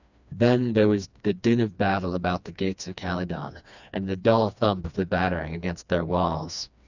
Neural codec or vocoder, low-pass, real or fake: codec, 16 kHz, 2 kbps, FreqCodec, smaller model; 7.2 kHz; fake